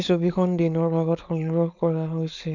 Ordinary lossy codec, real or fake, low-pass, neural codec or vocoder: none; fake; 7.2 kHz; codec, 16 kHz, 4.8 kbps, FACodec